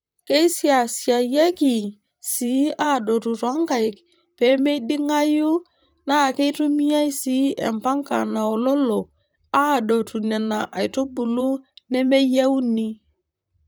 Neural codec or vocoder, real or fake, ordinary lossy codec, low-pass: vocoder, 44.1 kHz, 128 mel bands, Pupu-Vocoder; fake; none; none